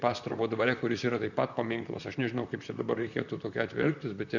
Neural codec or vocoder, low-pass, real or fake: vocoder, 44.1 kHz, 128 mel bands, Pupu-Vocoder; 7.2 kHz; fake